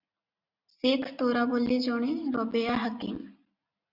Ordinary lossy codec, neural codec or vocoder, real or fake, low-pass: Opus, 64 kbps; none; real; 5.4 kHz